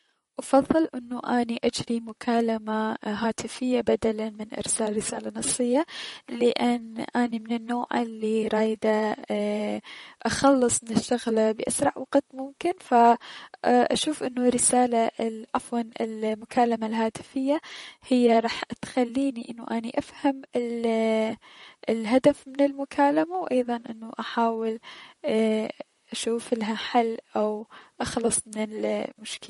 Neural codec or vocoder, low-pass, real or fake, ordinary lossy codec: vocoder, 44.1 kHz, 128 mel bands, Pupu-Vocoder; 19.8 kHz; fake; MP3, 48 kbps